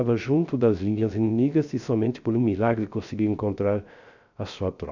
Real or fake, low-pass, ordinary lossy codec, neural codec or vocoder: fake; 7.2 kHz; none; codec, 16 kHz, 0.3 kbps, FocalCodec